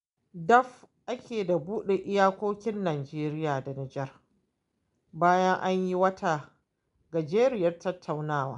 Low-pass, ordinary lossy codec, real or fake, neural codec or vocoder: 10.8 kHz; none; real; none